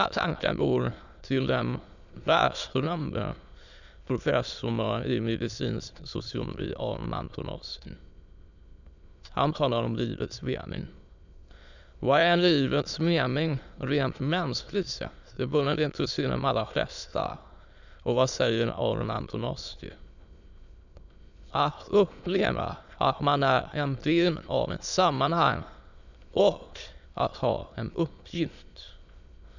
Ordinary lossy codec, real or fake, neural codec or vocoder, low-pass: none; fake; autoencoder, 22.05 kHz, a latent of 192 numbers a frame, VITS, trained on many speakers; 7.2 kHz